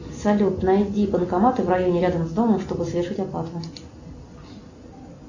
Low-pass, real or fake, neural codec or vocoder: 7.2 kHz; real; none